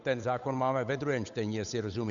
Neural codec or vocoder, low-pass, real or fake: codec, 16 kHz, 8 kbps, FunCodec, trained on Chinese and English, 25 frames a second; 7.2 kHz; fake